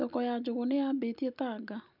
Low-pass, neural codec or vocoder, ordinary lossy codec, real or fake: 5.4 kHz; none; none; real